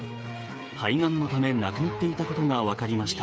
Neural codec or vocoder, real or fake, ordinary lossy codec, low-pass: codec, 16 kHz, 8 kbps, FreqCodec, smaller model; fake; none; none